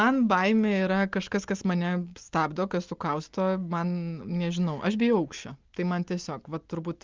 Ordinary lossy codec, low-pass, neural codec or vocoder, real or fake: Opus, 24 kbps; 7.2 kHz; none; real